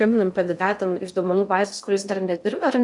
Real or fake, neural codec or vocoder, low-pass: fake; codec, 16 kHz in and 24 kHz out, 0.6 kbps, FocalCodec, streaming, 2048 codes; 10.8 kHz